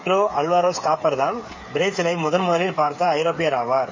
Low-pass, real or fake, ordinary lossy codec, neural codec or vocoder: 7.2 kHz; fake; MP3, 32 kbps; codec, 16 kHz, 8 kbps, FreqCodec, smaller model